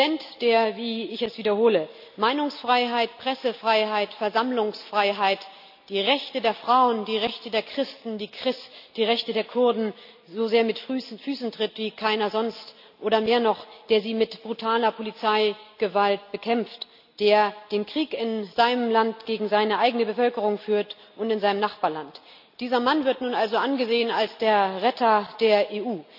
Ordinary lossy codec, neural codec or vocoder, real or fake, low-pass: AAC, 48 kbps; none; real; 5.4 kHz